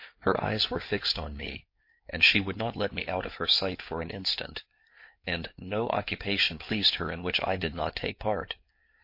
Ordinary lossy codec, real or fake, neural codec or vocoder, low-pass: MP3, 32 kbps; fake; codec, 16 kHz in and 24 kHz out, 2.2 kbps, FireRedTTS-2 codec; 5.4 kHz